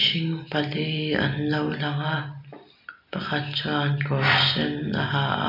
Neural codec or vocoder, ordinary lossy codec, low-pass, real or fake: none; none; 5.4 kHz; real